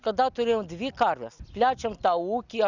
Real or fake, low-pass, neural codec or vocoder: real; 7.2 kHz; none